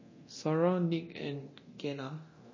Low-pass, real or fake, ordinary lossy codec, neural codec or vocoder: 7.2 kHz; fake; MP3, 32 kbps; codec, 24 kHz, 0.9 kbps, DualCodec